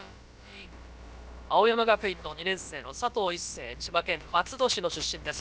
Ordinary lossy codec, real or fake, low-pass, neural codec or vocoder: none; fake; none; codec, 16 kHz, about 1 kbps, DyCAST, with the encoder's durations